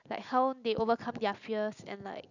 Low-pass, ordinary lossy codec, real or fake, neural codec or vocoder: 7.2 kHz; none; real; none